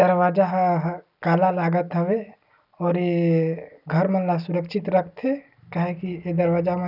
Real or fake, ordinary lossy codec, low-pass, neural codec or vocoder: real; none; 5.4 kHz; none